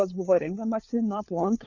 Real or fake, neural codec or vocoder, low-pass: fake; codec, 16 kHz, 4 kbps, FunCodec, trained on LibriTTS, 50 frames a second; 7.2 kHz